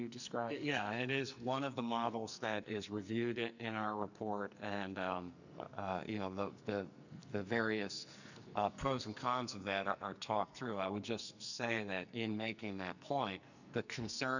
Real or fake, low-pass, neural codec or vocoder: fake; 7.2 kHz; codec, 44.1 kHz, 2.6 kbps, SNAC